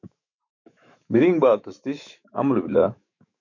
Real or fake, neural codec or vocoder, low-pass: fake; autoencoder, 48 kHz, 128 numbers a frame, DAC-VAE, trained on Japanese speech; 7.2 kHz